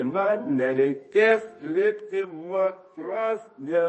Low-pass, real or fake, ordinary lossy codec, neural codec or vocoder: 10.8 kHz; fake; MP3, 32 kbps; codec, 24 kHz, 0.9 kbps, WavTokenizer, medium music audio release